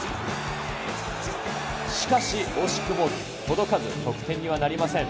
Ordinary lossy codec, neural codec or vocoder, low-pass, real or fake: none; none; none; real